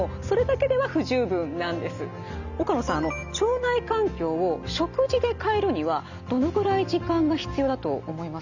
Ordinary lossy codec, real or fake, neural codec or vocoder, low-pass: none; real; none; 7.2 kHz